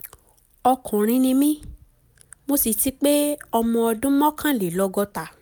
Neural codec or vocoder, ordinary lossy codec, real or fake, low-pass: none; none; real; none